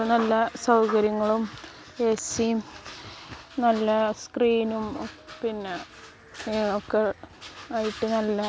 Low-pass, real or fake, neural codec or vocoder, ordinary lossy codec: none; real; none; none